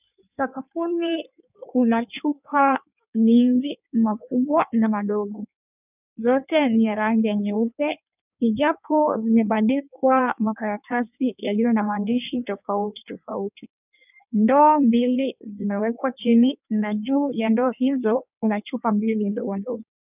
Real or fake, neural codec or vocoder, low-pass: fake; codec, 16 kHz in and 24 kHz out, 1.1 kbps, FireRedTTS-2 codec; 3.6 kHz